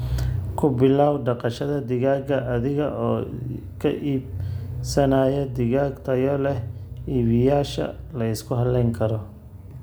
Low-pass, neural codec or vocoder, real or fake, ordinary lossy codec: none; none; real; none